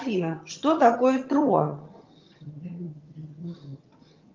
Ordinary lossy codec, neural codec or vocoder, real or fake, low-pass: Opus, 32 kbps; vocoder, 22.05 kHz, 80 mel bands, HiFi-GAN; fake; 7.2 kHz